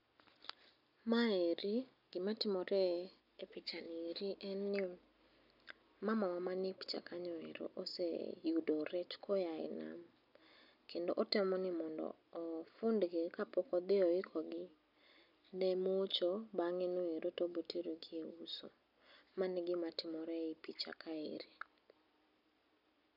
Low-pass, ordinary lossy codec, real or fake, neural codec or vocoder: 5.4 kHz; none; real; none